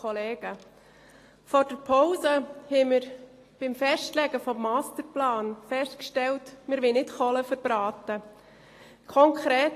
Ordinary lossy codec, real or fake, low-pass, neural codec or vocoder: AAC, 48 kbps; real; 14.4 kHz; none